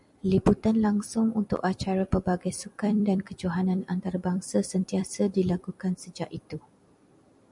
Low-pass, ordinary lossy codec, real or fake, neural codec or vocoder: 10.8 kHz; MP3, 96 kbps; fake; vocoder, 44.1 kHz, 128 mel bands every 256 samples, BigVGAN v2